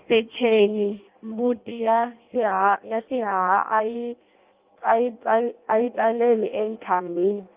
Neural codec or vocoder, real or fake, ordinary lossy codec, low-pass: codec, 16 kHz in and 24 kHz out, 0.6 kbps, FireRedTTS-2 codec; fake; Opus, 24 kbps; 3.6 kHz